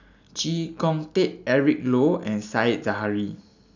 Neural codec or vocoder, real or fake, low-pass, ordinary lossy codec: none; real; 7.2 kHz; none